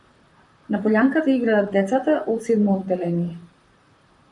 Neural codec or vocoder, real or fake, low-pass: vocoder, 44.1 kHz, 128 mel bands, Pupu-Vocoder; fake; 10.8 kHz